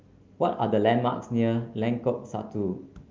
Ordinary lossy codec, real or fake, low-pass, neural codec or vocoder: Opus, 24 kbps; real; 7.2 kHz; none